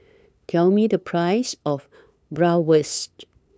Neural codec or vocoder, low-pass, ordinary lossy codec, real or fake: codec, 16 kHz, 8 kbps, FunCodec, trained on LibriTTS, 25 frames a second; none; none; fake